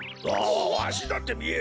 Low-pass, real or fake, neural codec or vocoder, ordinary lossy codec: none; real; none; none